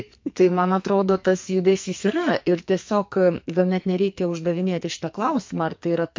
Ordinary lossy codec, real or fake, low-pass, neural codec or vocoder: MP3, 48 kbps; fake; 7.2 kHz; codec, 44.1 kHz, 2.6 kbps, SNAC